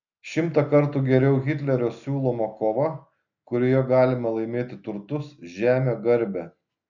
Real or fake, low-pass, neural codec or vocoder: real; 7.2 kHz; none